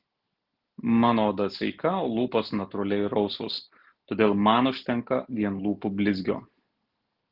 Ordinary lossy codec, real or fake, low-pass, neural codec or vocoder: Opus, 16 kbps; real; 5.4 kHz; none